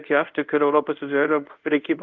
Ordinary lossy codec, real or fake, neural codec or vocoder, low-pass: Opus, 32 kbps; fake; codec, 24 kHz, 0.5 kbps, DualCodec; 7.2 kHz